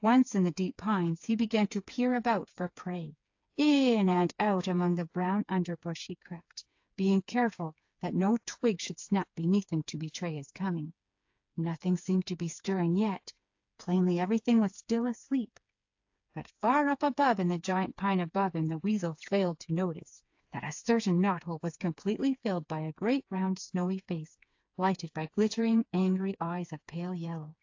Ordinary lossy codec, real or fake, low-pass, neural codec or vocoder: AAC, 48 kbps; fake; 7.2 kHz; codec, 16 kHz, 4 kbps, FreqCodec, smaller model